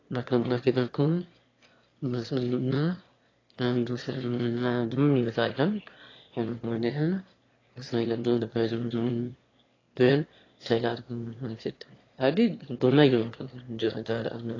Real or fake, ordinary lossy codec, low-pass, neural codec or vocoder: fake; AAC, 32 kbps; 7.2 kHz; autoencoder, 22.05 kHz, a latent of 192 numbers a frame, VITS, trained on one speaker